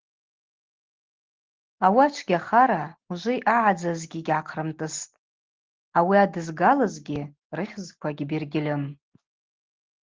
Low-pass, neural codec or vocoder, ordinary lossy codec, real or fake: 7.2 kHz; none; Opus, 16 kbps; real